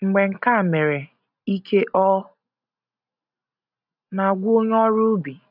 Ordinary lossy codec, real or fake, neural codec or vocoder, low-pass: none; real; none; 5.4 kHz